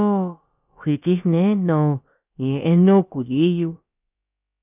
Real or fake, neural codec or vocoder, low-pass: fake; codec, 16 kHz, about 1 kbps, DyCAST, with the encoder's durations; 3.6 kHz